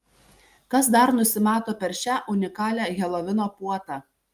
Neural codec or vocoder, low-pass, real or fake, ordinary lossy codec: none; 14.4 kHz; real; Opus, 32 kbps